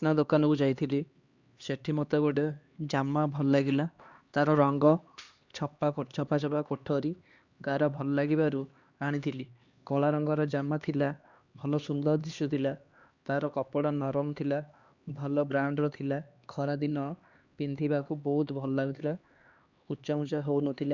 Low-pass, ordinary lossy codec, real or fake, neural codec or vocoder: 7.2 kHz; Opus, 64 kbps; fake; codec, 16 kHz, 2 kbps, X-Codec, HuBERT features, trained on LibriSpeech